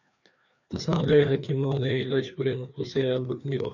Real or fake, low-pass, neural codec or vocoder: fake; 7.2 kHz; codec, 16 kHz, 2 kbps, FreqCodec, larger model